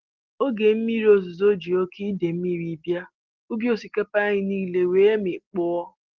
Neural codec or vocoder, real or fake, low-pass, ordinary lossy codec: none; real; 7.2 kHz; Opus, 16 kbps